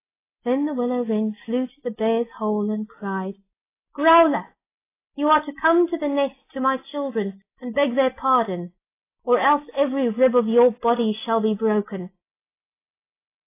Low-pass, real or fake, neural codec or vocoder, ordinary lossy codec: 3.6 kHz; real; none; AAC, 24 kbps